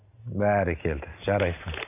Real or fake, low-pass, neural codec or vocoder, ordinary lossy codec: real; 3.6 kHz; none; none